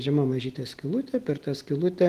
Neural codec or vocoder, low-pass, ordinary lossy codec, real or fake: none; 14.4 kHz; Opus, 16 kbps; real